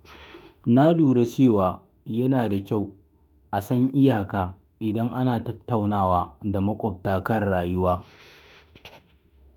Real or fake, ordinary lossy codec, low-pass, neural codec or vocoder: fake; none; 19.8 kHz; autoencoder, 48 kHz, 32 numbers a frame, DAC-VAE, trained on Japanese speech